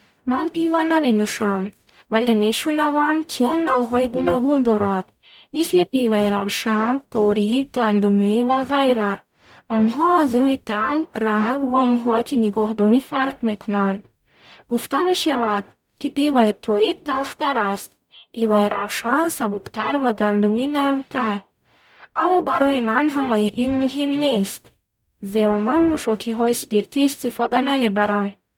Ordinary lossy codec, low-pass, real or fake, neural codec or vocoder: none; 19.8 kHz; fake; codec, 44.1 kHz, 0.9 kbps, DAC